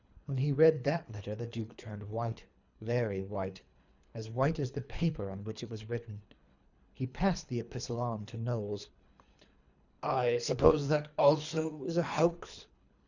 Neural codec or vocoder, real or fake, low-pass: codec, 24 kHz, 3 kbps, HILCodec; fake; 7.2 kHz